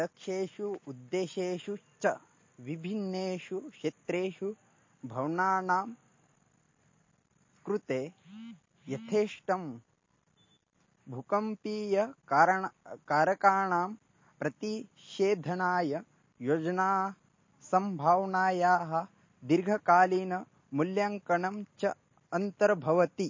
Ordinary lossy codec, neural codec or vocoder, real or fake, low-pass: MP3, 32 kbps; none; real; 7.2 kHz